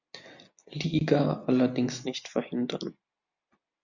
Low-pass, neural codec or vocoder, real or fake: 7.2 kHz; none; real